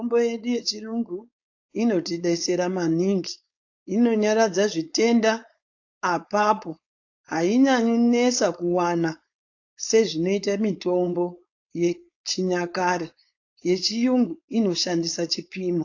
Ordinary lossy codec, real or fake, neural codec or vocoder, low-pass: AAC, 48 kbps; fake; codec, 16 kHz, 4.8 kbps, FACodec; 7.2 kHz